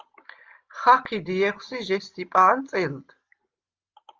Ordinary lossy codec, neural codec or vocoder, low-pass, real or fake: Opus, 24 kbps; none; 7.2 kHz; real